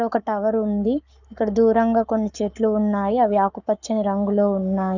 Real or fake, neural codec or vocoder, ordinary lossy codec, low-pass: fake; codec, 44.1 kHz, 7.8 kbps, Pupu-Codec; none; 7.2 kHz